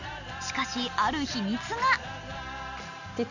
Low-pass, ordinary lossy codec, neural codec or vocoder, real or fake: 7.2 kHz; none; none; real